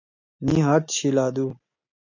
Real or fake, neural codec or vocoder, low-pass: real; none; 7.2 kHz